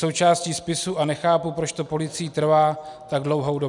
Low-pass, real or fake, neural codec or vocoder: 10.8 kHz; real; none